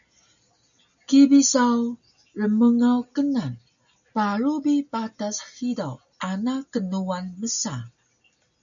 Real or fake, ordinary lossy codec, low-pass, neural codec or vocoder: real; MP3, 96 kbps; 7.2 kHz; none